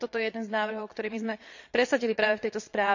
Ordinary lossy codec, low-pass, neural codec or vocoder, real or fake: none; 7.2 kHz; vocoder, 22.05 kHz, 80 mel bands, Vocos; fake